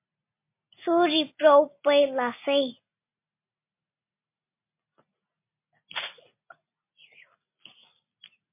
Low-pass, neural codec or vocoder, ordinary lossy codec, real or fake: 3.6 kHz; none; MP3, 24 kbps; real